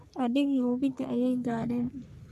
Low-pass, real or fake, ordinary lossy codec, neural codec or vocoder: 14.4 kHz; fake; none; codec, 44.1 kHz, 3.4 kbps, Pupu-Codec